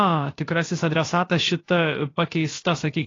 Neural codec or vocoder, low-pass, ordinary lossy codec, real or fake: codec, 16 kHz, about 1 kbps, DyCAST, with the encoder's durations; 7.2 kHz; AAC, 32 kbps; fake